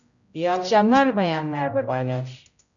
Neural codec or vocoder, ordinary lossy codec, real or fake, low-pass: codec, 16 kHz, 0.5 kbps, X-Codec, HuBERT features, trained on general audio; MP3, 64 kbps; fake; 7.2 kHz